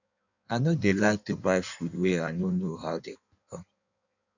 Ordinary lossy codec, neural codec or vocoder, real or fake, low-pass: none; codec, 16 kHz in and 24 kHz out, 1.1 kbps, FireRedTTS-2 codec; fake; 7.2 kHz